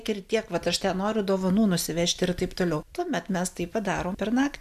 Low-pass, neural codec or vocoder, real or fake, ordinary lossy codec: 14.4 kHz; none; real; MP3, 96 kbps